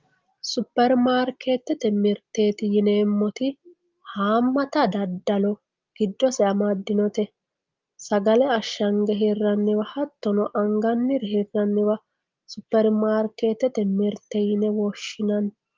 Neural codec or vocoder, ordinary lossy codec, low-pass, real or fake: none; Opus, 32 kbps; 7.2 kHz; real